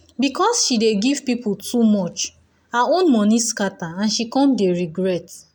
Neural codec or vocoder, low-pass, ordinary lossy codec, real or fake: none; 19.8 kHz; none; real